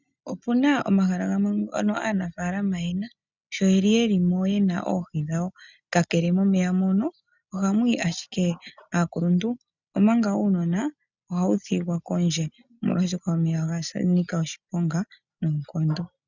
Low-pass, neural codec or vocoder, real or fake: 7.2 kHz; none; real